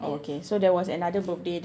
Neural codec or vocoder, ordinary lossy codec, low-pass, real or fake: none; none; none; real